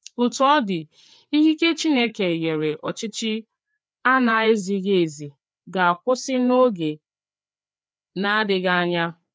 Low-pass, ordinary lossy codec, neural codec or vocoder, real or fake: none; none; codec, 16 kHz, 4 kbps, FreqCodec, larger model; fake